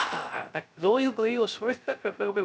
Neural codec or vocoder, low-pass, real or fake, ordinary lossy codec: codec, 16 kHz, 0.3 kbps, FocalCodec; none; fake; none